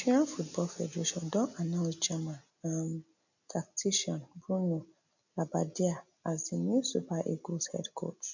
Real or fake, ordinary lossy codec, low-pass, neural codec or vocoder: real; none; 7.2 kHz; none